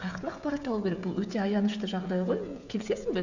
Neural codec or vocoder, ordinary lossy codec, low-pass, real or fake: codec, 16 kHz, 16 kbps, FreqCodec, smaller model; none; 7.2 kHz; fake